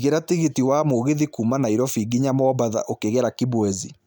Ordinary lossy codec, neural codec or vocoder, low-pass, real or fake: none; none; none; real